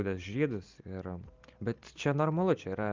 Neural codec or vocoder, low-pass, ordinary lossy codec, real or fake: none; 7.2 kHz; Opus, 32 kbps; real